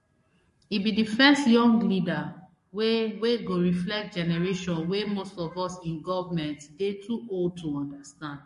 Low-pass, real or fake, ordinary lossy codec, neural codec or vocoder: 14.4 kHz; fake; MP3, 48 kbps; codec, 44.1 kHz, 7.8 kbps, Pupu-Codec